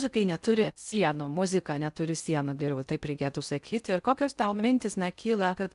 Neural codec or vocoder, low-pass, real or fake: codec, 16 kHz in and 24 kHz out, 0.6 kbps, FocalCodec, streaming, 4096 codes; 10.8 kHz; fake